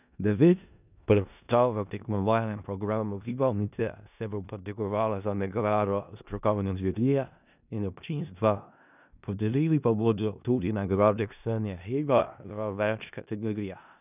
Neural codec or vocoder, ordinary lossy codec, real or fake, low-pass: codec, 16 kHz in and 24 kHz out, 0.4 kbps, LongCat-Audio-Codec, four codebook decoder; none; fake; 3.6 kHz